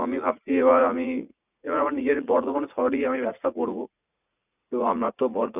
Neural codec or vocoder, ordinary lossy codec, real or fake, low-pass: vocoder, 44.1 kHz, 80 mel bands, Vocos; none; fake; 3.6 kHz